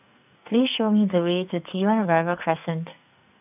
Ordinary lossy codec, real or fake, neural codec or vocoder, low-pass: none; fake; codec, 44.1 kHz, 2.6 kbps, SNAC; 3.6 kHz